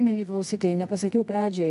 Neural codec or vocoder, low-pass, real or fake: codec, 24 kHz, 0.9 kbps, WavTokenizer, medium music audio release; 10.8 kHz; fake